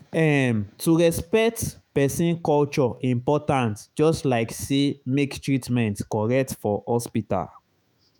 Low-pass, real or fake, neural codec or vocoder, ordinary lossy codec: none; fake; autoencoder, 48 kHz, 128 numbers a frame, DAC-VAE, trained on Japanese speech; none